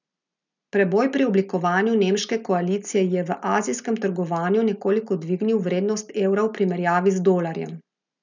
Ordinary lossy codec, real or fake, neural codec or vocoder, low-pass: none; real; none; 7.2 kHz